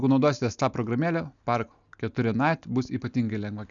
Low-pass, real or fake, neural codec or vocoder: 7.2 kHz; real; none